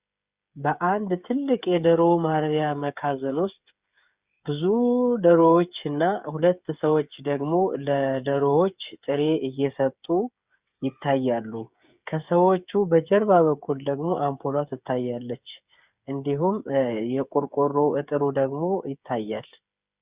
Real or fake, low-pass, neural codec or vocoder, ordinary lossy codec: fake; 3.6 kHz; codec, 16 kHz, 8 kbps, FreqCodec, smaller model; Opus, 64 kbps